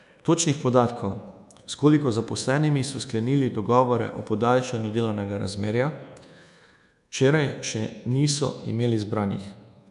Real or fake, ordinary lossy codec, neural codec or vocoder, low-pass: fake; none; codec, 24 kHz, 1.2 kbps, DualCodec; 10.8 kHz